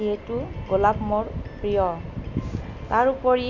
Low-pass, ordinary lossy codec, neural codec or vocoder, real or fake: 7.2 kHz; none; none; real